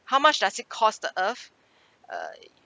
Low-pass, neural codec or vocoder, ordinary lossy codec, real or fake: none; none; none; real